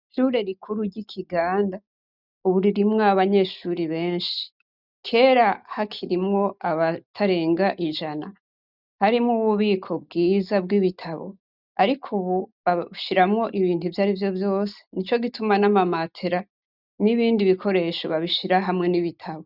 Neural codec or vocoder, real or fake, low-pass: none; real; 5.4 kHz